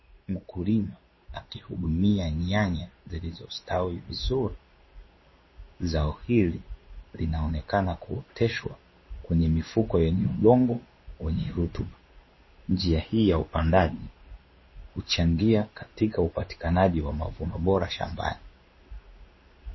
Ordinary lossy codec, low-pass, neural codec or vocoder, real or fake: MP3, 24 kbps; 7.2 kHz; codec, 16 kHz in and 24 kHz out, 1 kbps, XY-Tokenizer; fake